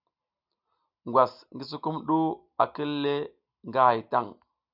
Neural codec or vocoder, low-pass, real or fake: none; 5.4 kHz; real